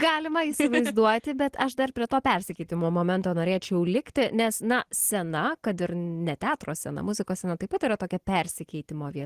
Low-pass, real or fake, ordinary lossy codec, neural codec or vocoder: 14.4 kHz; real; Opus, 16 kbps; none